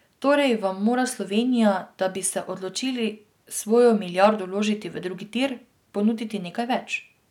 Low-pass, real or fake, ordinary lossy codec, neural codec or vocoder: 19.8 kHz; real; none; none